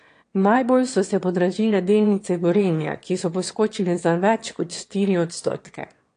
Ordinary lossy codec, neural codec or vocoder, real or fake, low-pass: AAC, 48 kbps; autoencoder, 22.05 kHz, a latent of 192 numbers a frame, VITS, trained on one speaker; fake; 9.9 kHz